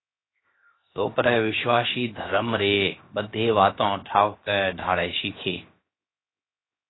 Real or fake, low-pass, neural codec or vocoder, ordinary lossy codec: fake; 7.2 kHz; codec, 16 kHz, 0.7 kbps, FocalCodec; AAC, 16 kbps